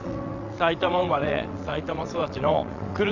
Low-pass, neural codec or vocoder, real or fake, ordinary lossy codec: 7.2 kHz; vocoder, 22.05 kHz, 80 mel bands, WaveNeXt; fake; none